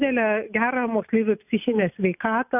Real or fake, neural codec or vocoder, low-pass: real; none; 3.6 kHz